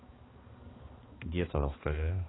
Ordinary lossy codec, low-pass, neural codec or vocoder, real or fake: AAC, 16 kbps; 7.2 kHz; codec, 16 kHz, 2 kbps, X-Codec, HuBERT features, trained on balanced general audio; fake